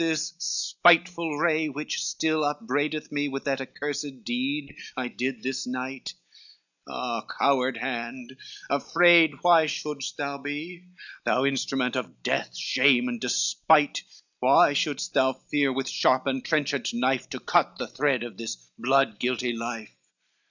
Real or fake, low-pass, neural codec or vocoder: real; 7.2 kHz; none